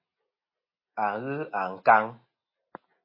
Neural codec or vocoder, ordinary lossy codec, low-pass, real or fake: none; MP3, 24 kbps; 5.4 kHz; real